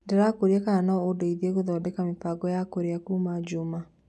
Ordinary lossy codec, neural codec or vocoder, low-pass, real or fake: none; none; none; real